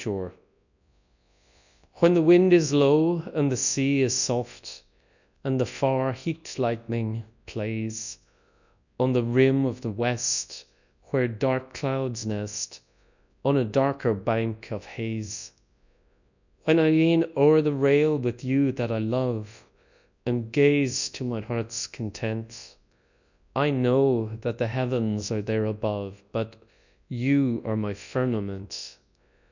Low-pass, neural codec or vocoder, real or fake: 7.2 kHz; codec, 24 kHz, 0.9 kbps, WavTokenizer, large speech release; fake